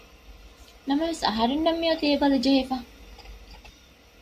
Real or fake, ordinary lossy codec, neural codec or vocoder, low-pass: real; AAC, 96 kbps; none; 14.4 kHz